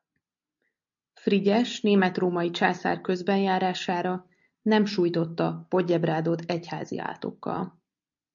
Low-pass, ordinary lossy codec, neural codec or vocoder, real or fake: 7.2 kHz; MP3, 64 kbps; none; real